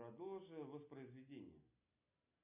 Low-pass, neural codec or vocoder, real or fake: 3.6 kHz; none; real